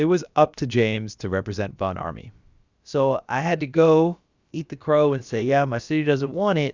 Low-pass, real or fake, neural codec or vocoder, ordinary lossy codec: 7.2 kHz; fake; codec, 16 kHz, about 1 kbps, DyCAST, with the encoder's durations; Opus, 64 kbps